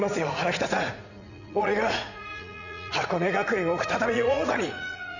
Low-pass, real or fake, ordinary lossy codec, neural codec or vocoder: 7.2 kHz; fake; none; vocoder, 44.1 kHz, 80 mel bands, Vocos